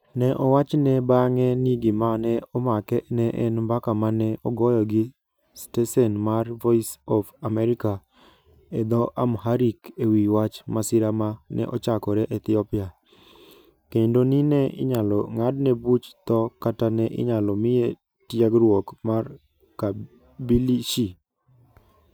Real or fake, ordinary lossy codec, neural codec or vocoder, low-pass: real; none; none; none